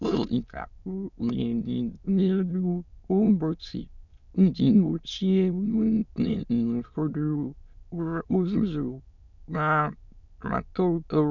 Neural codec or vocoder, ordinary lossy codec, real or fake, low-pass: autoencoder, 22.05 kHz, a latent of 192 numbers a frame, VITS, trained on many speakers; none; fake; 7.2 kHz